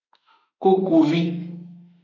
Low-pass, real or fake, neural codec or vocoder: 7.2 kHz; fake; autoencoder, 48 kHz, 32 numbers a frame, DAC-VAE, trained on Japanese speech